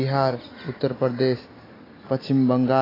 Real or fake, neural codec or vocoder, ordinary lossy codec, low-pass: real; none; AAC, 24 kbps; 5.4 kHz